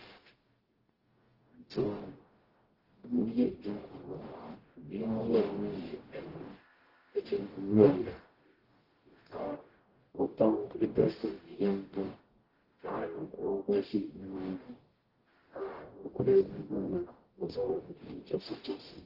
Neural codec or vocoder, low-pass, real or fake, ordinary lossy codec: codec, 44.1 kHz, 0.9 kbps, DAC; 5.4 kHz; fake; Opus, 16 kbps